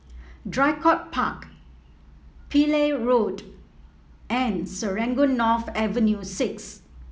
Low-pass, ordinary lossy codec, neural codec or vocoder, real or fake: none; none; none; real